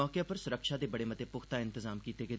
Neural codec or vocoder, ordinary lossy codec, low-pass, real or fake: none; none; none; real